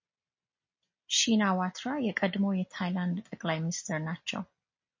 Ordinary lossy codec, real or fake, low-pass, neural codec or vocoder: MP3, 32 kbps; real; 7.2 kHz; none